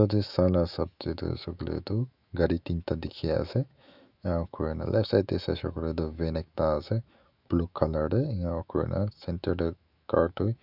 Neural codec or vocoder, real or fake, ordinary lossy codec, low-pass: autoencoder, 48 kHz, 128 numbers a frame, DAC-VAE, trained on Japanese speech; fake; none; 5.4 kHz